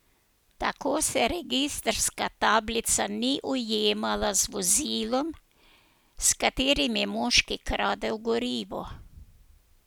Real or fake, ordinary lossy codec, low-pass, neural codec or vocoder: real; none; none; none